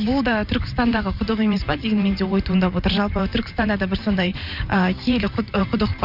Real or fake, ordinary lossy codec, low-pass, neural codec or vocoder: fake; Opus, 64 kbps; 5.4 kHz; vocoder, 44.1 kHz, 128 mel bands every 512 samples, BigVGAN v2